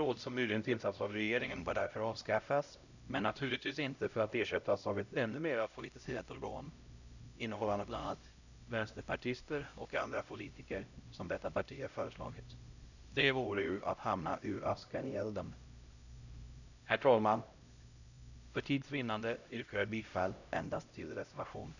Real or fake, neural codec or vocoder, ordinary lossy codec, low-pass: fake; codec, 16 kHz, 0.5 kbps, X-Codec, HuBERT features, trained on LibriSpeech; none; 7.2 kHz